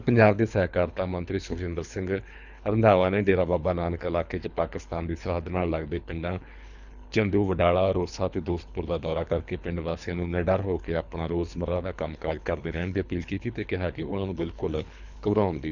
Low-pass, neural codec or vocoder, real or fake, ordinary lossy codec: 7.2 kHz; codec, 24 kHz, 3 kbps, HILCodec; fake; none